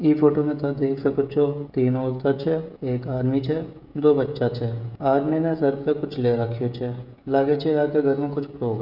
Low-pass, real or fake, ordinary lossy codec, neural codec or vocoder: 5.4 kHz; fake; none; codec, 16 kHz, 16 kbps, FreqCodec, smaller model